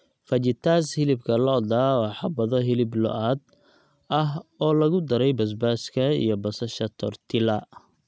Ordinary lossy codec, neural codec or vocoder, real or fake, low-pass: none; none; real; none